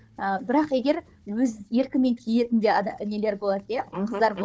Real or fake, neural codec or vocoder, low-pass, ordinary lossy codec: fake; codec, 16 kHz, 4 kbps, FunCodec, trained on Chinese and English, 50 frames a second; none; none